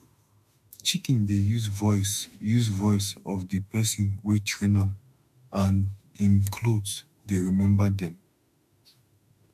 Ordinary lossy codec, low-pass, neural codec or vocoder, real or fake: MP3, 96 kbps; 14.4 kHz; autoencoder, 48 kHz, 32 numbers a frame, DAC-VAE, trained on Japanese speech; fake